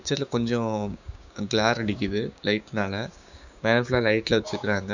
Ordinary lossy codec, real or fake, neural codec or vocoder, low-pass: none; fake; codec, 16 kHz, 6 kbps, DAC; 7.2 kHz